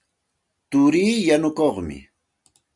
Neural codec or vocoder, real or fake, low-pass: none; real; 10.8 kHz